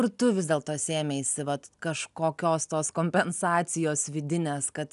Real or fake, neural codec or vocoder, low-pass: real; none; 10.8 kHz